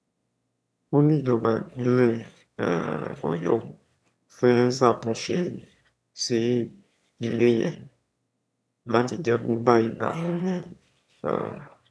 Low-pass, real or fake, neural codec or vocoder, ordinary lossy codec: none; fake; autoencoder, 22.05 kHz, a latent of 192 numbers a frame, VITS, trained on one speaker; none